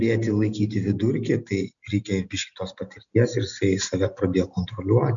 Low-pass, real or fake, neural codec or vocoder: 7.2 kHz; real; none